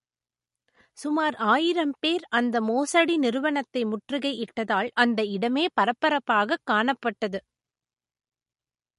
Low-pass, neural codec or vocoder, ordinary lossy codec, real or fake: 14.4 kHz; none; MP3, 48 kbps; real